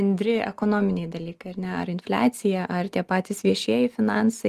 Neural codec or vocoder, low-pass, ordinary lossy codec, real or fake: none; 14.4 kHz; Opus, 24 kbps; real